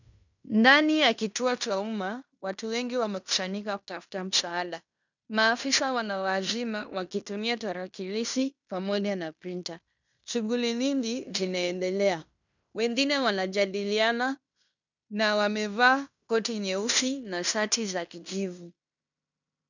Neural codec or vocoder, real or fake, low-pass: codec, 16 kHz in and 24 kHz out, 0.9 kbps, LongCat-Audio-Codec, fine tuned four codebook decoder; fake; 7.2 kHz